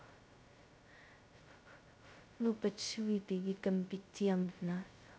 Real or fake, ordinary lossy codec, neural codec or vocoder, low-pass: fake; none; codec, 16 kHz, 0.2 kbps, FocalCodec; none